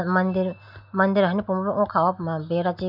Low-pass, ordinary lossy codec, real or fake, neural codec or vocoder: 5.4 kHz; none; real; none